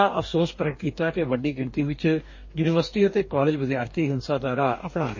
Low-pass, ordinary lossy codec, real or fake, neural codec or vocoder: 7.2 kHz; MP3, 32 kbps; fake; codec, 44.1 kHz, 2.6 kbps, DAC